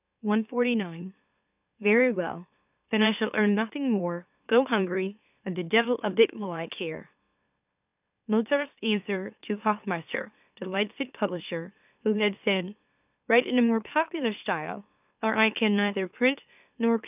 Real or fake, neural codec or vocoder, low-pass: fake; autoencoder, 44.1 kHz, a latent of 192 numbers a frame, MeloTTS; 3.6 kHz